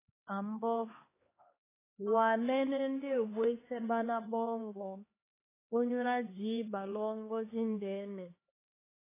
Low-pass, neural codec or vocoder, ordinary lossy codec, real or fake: 3.6 kHz; codec, 16 kHz, 2 kbps, X-Codec, HuBERT features, trained on LibriSpeech; AAC, 16 kbps; fake